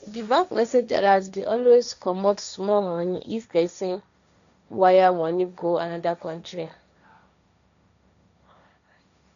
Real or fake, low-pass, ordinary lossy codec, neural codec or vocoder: fake; 7.2 kHz; none; codec, 16 kHz, 1.1 kbps, Voila-Tokenizer